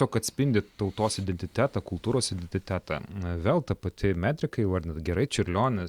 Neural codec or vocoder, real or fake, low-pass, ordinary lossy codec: none; real; 19.8 kHz; Opus, 64 kbps